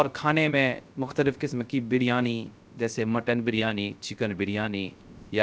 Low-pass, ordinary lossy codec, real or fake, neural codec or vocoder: none; none; fake; codec, 16 kHz, 0.3 kbps, FocalCodec